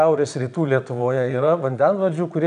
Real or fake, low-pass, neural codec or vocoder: fake; 9.9 kHz; vocoder, 22.05 kHz, 80 mel bands, Vocos